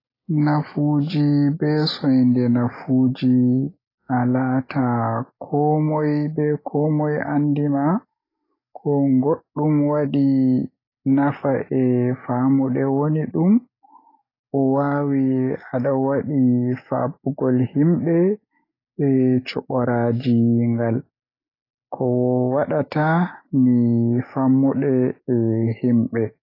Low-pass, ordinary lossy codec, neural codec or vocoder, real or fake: 5.4 kHz; AAC, 24 kbps; none; real